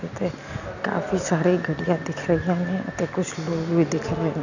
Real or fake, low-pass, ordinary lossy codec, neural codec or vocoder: real; 7.2 kHz; none; none